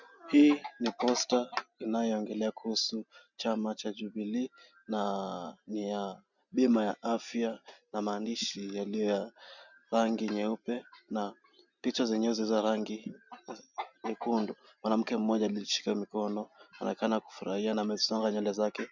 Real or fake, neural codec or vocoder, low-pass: real; none; 7.2 kHz